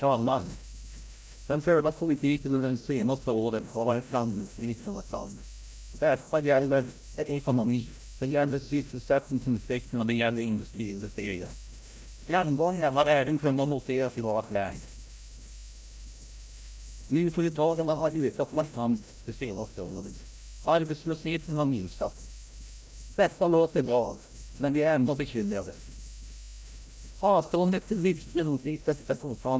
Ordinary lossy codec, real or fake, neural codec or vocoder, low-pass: none; fake; codec, 16 kHz, 0.5 kbps, FreqCodec, larger model; none